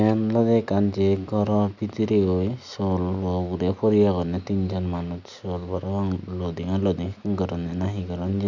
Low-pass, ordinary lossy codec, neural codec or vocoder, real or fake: 7.2 kHz; none; none; real